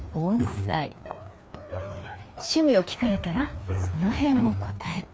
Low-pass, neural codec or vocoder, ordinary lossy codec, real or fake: none; codec, 16 kHz, 2 kbps, FreqCodec, larger model; none; fake